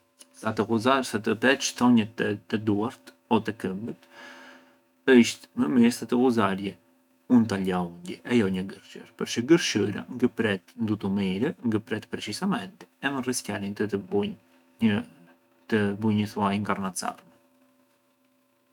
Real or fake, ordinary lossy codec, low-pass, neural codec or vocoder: fake; none; 19.8 kHz; autoencoder, 48 kHz, 128 numbers a frame, DAC-VAE, trained on Japanese speech